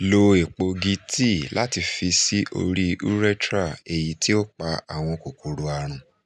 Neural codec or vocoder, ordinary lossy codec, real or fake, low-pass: none; none; real; none